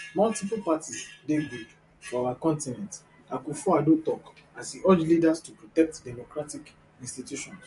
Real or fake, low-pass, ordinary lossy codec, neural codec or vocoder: fake; 14.4 kHz; MP3, 48 kbps; vocoder, 44.1 kHz, 128 mel bands every 256 samples, BigVGAN v2